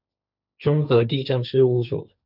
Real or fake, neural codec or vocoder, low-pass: fake; codec, 16 kHz, 1.1 kbps, Voila-Tokenizer; 5.4 kHz